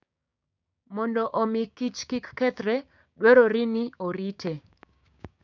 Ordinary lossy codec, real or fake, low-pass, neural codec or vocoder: none; fake; 7.2 kHz; codec, 16 kHz, 6 kbps, DAC